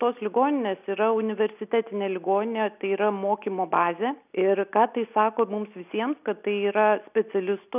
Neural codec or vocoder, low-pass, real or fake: none; 3.6 kHz; real